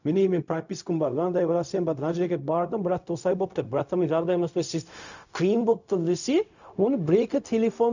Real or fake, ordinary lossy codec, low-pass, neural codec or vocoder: fake; none; 7.2 kHz; codec, 16 kHz, 0.4 kbps, LongCat-Audio-Codec